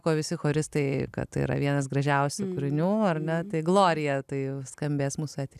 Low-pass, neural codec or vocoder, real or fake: 14.4 kHz; none; real